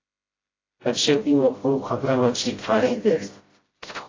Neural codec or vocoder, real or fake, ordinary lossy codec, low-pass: codec, 16 kHz, 0.5 kbps, FreqCodec, smaller model; fake; AAC, 32 kbps; 7.2 kHz